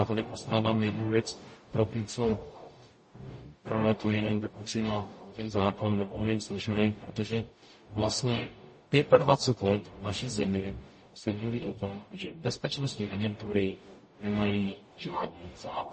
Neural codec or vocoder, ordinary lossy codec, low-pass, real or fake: codec, 44.1 kHz, 0.9 kbps, DAC; MP3, 32 kbps; 10.8 kHz; fake